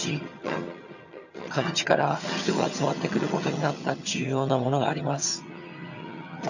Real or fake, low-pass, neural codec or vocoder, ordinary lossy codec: fake; 7.2 kHz; vocoder, 22.05 kHz, 80 mel bands, HiFi-GAN; none